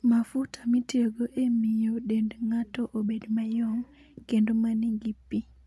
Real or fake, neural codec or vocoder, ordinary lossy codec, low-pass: real; none; none; none